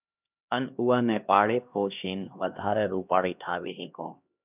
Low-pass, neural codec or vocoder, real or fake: 3.6 kHz; codec, 16 kHz, 1 kbps, X-Codec, HuBERT features, trained on LibriSpeech; fake